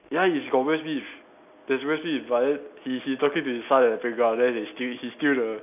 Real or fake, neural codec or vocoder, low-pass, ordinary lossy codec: real; none; 3.6 kHz; none